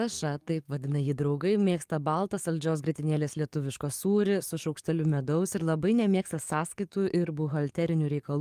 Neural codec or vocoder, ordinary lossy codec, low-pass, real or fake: codec, 44.1 kHz, 7.8 kbps, DAC; Opus, 32 kbps; 14.4 kHz; fake